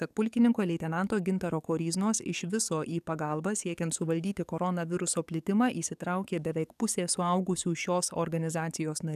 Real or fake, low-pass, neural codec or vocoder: fake; 14.4 kHz; codec, 44.1 kHz, 7.8 kbps, DAC